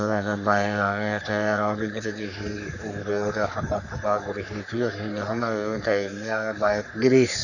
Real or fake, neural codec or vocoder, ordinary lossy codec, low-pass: fake; codec, 44.1 kHz, 3.4 kbps, Pupu-Codec; none; 7.2 kHz